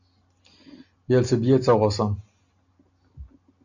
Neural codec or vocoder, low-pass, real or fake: none; 7.2 kHz; real